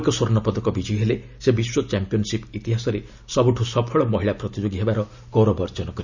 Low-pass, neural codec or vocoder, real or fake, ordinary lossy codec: 7.2 kHz; none; real; none